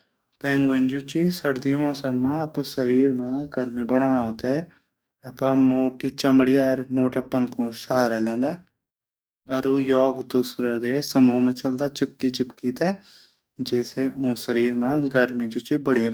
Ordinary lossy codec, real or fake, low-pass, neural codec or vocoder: none; fake; none; codec, 44.1 kHz, 2.6 kbps, DAC